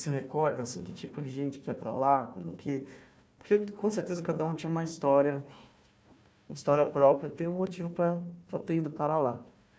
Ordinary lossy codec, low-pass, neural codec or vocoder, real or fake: none; none; codec, 16 kHz, 1 kbps, FunCodec, trained on Chinese and English, 50 frames a second; fake